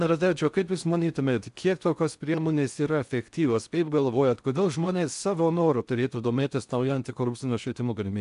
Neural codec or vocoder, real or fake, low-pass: codec, 16 kHz in and 24 kHz out, 0.6 kbps, FocalCodec, streaming, 4096 codes; fake; 10.8 kHz